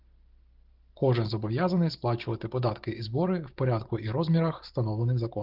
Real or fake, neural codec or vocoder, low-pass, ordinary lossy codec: real; none; 5.4 kHz; Opus, 16 kbps